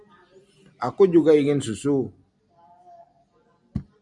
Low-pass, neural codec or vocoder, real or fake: 10.8 kHz; none; real